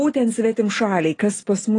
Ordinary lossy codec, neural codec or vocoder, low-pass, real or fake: AAC, 32 kbps; none; 10.8 kHz; real